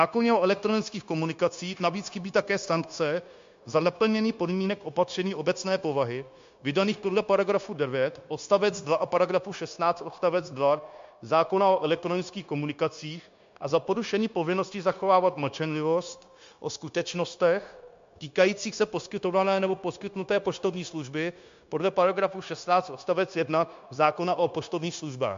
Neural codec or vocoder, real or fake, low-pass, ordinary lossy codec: codec, 16 kHz, 0.9 kbps, LongCat-Audio-Codec; fake; 7.2 kHz; MP3, 48 kbps